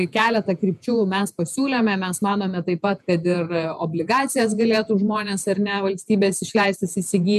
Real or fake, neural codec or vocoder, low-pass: fake; vocoder, 48 kHz, 128 mel bands, Vocos; 14.4 kHz